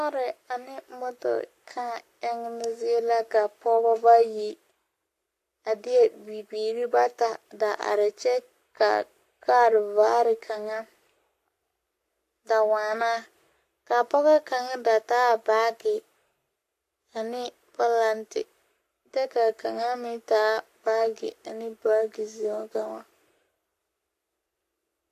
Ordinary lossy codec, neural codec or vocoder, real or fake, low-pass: AAC, 64 kbps; codec, 44.1 kHz, 7.8 kbps, Pupu-Codec; fake; 14.4 kHz